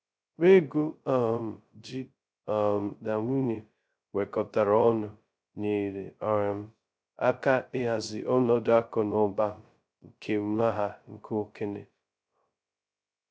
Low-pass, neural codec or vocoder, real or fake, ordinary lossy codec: none; codec, 16 kHz, 0.2 kbps, FocalCodec; fake; none